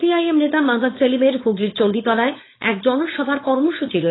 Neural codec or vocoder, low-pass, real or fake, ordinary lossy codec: codec, 16 kHz, 4.8 kbps, FACodec; 7.2 kHz; fake; AAC, 16 kbps